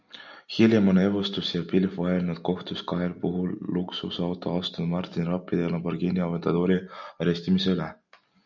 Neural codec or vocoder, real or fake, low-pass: none; real; 7.2 kHz